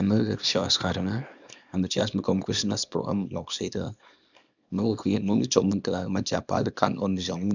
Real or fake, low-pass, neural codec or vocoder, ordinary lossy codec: fake; 7.2 kHz; codec, 24 kHz, 0.9 kbps, WavTokenizer, small release; none